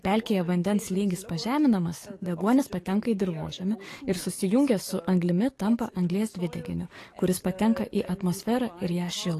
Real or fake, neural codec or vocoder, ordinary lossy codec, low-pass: fake; codec, 44.1 kHz, 7.8 kbps, DAC; AAC, 48 kbps; 14.4 kHz